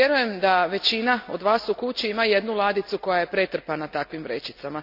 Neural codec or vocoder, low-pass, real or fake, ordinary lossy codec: none; 5.4 kHz; real; none